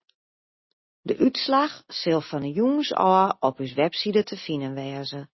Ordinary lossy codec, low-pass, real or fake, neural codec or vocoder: MP3, 24 kbps; 7.2 kHz; real; none